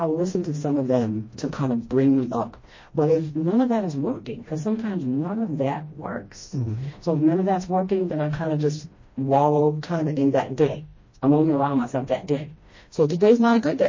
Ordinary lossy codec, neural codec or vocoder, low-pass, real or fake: MP3, 32 kbps; codec, 16 kHz, 1 kbps, FreqCodec, smaller model; 7.2 kHz; fake